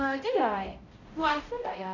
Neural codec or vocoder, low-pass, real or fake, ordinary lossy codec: codec, 16 kHz, 0.5 kbps, X-Codec, HuBERT features, trained on balanced general audio; 7.2 kHz; fake; none